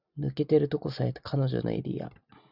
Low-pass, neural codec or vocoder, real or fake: 5.4 kHz; none; real